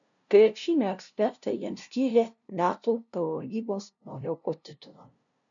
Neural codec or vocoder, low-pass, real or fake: codec, 16 kHz, 0.5 kbps, FunCodec, trained on LibriTTS, 25 frames a second; 7.2 kHz; fake